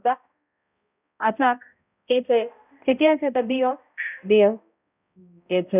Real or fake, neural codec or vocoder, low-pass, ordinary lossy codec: fake; codec, 16 kHz, 0.5 kbps, X-Codec, HuBERT features, trained on balanced general audio; 3.6 kHz; none